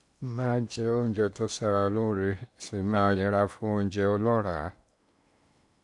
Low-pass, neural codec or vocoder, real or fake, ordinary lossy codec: 10.8 kHz; codec, 16 kHz in and 24 kHz out, 0.8 kbps, FocalCodec, streaming, 65536 codes; fake; none